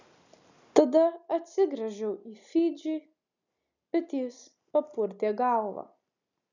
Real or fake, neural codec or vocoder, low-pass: real; none; 7.2 kHz